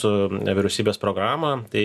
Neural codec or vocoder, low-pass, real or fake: none; 14.4 kHz; real